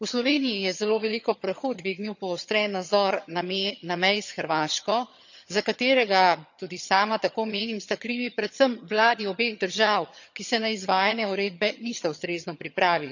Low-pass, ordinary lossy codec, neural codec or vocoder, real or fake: 7.2 kHz; none; vocoder, 22.05 kHz, 80 mel bands, HiFi-GAN; fake